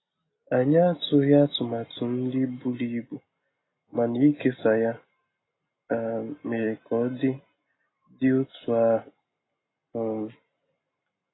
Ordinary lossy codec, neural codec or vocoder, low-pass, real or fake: AAC, 16 kbps; none; 7.2 kHz; real